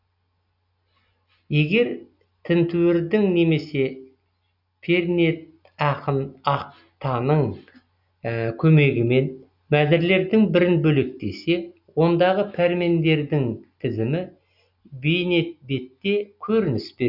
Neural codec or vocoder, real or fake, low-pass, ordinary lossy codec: none; real; 5.4 kHz; none